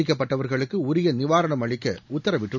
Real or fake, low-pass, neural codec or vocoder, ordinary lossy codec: real; 7.2 kHz; none; none